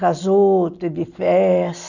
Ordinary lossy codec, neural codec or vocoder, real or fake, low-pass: none; none; real; 7.2 kHz